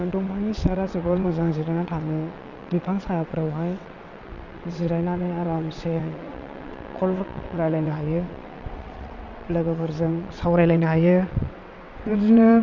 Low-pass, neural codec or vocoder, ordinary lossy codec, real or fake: 7.2 kHz; vocoder, 22.05 kHz, 80 mel bands, WaveNeXt; none; fake